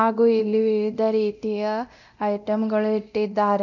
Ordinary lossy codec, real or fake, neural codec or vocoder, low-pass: none; fake; codec, 24 kHz, 0.9 kbps, DualCodec; 7.2 kHz